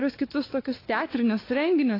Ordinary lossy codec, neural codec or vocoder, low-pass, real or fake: AAC, 24 kbps; codec, 16 kHz, 4 kbps, X-Codec, WavLM features, trained on Multilingual LibriSpeech; 5.4 kHz; fake